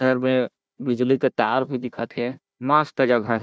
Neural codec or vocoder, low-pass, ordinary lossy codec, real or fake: codec, 16 kHz, 1 kbps, FunCodec, trained on Chinese and English, 50 frames a second; none; none; fake